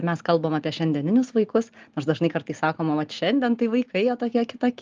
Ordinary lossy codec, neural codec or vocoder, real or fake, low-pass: Opus, 32 kbps; none; real; 7.2 kHz